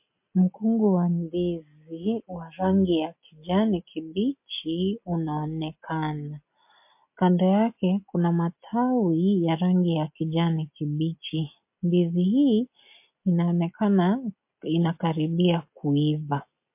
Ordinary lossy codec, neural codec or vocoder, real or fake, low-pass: MP3, 32 kbps; none; real; 3.6 kHz